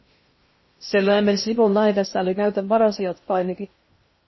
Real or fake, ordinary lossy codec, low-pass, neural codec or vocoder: fake; MP3, 24 kbps; 7.2 kHz; codec, 16 kHz in and 24 kHz out, 0.6 kbps, FocalCodec, streaming, 2048 codes